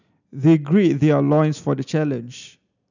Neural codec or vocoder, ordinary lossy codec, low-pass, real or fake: none; none; 7.2 kHz; real